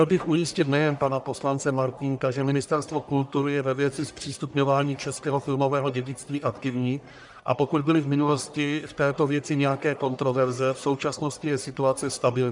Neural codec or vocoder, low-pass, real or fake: codec, 44.1 kHz, 1.7 kbps, Pupu-Codec; 10.8 kHz; fake